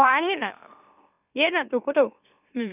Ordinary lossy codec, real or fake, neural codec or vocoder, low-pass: none; fake; autoencoder, 44.1 kHz, a latent of 192 numbers a frame, MeloTTS; 3.6 kHz